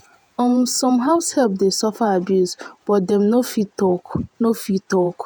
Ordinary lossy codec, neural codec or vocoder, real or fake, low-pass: none; vocoder, 48 kHz, 128 mel bands, Vocos; fake; none